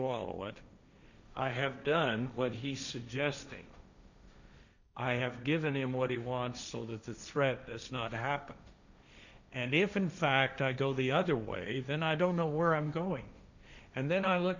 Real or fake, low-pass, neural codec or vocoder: fake; 7.2 kHz; codec, 16 kHz, 1.1 kbps, Voila-Tokenizer